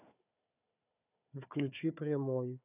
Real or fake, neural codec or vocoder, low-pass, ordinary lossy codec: fake; codec, 16 kHz, 4 kbps, FunCodec, trained on Chinese and English, 50 frames a second; 3.6 kHz; none